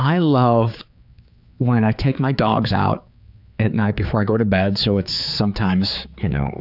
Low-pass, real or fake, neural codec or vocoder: 5.4 kHz; fake; codec, 16 kHz, 4 kbps, X-Codec, HuBERT features, trained on general audio